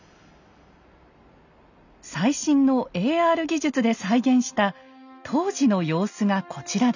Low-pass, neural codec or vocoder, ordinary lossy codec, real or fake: 7.2 kHz; none; none; real